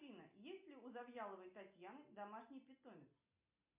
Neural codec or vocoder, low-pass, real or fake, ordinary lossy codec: none; 3.6 kHz; real; MP3, 32 kbps